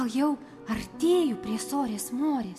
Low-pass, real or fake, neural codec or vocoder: 14.4 kHz; real; none